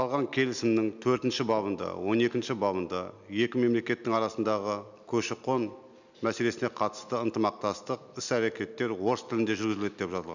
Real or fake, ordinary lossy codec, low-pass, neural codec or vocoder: real; none; 7.2 kHz; none